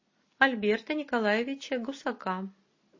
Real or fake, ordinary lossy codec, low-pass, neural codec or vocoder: real; MP3, 32 kbps; 7.2 kHz; none